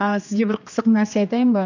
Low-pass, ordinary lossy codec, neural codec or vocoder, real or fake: 7.2 kHz; none; codec, 16 kHz, 2 kbps, X-Codec, HuBERT features, trained on general audio; fake